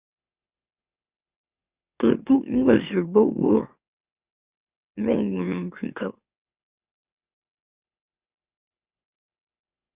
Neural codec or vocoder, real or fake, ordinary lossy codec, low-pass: autoencoder, 44.1 kHz, a latent of 192 numbers a frame, MeloTTS; fake; Opus, 64 kbps; 3.6 kHz